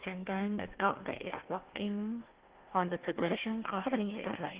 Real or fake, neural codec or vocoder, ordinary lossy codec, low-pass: fake; codec, 16 kHz, 1 kbps, FunCodec, trained on Chinese and English, 50 frames a second; Opus, 16 kbps; 3.6 kHz